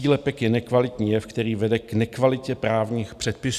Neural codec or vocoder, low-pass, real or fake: none; 14.4 kHz; real